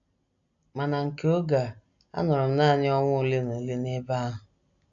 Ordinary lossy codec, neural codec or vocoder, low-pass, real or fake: AAC, 64 kbps; none; 7.2 kHz; real